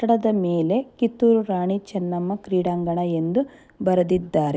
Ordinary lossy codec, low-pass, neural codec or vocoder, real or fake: none; none; none; real